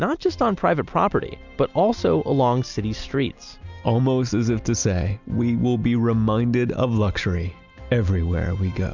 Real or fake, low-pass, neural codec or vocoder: real; 7.2 kHz; none